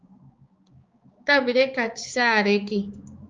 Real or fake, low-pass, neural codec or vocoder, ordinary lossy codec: fake; 7.2 kHz; codec, 16 kHz, 4 kbps, X-Codec, HuBERT features, trained on balanced general audio; Opus, 24 kbps